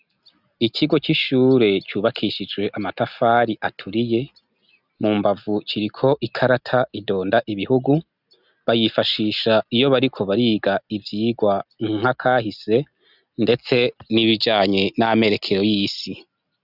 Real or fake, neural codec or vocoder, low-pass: real; none; 5.4 kHz